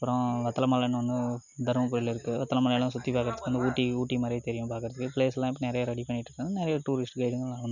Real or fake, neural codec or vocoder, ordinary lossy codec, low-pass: real; none; none; 7.2 kHz